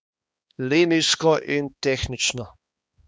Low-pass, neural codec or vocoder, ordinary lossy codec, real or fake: none; codec, 16 kHz, 2 kbps, X-Codec, HuBERT features, trained on balanced general audio; none; fake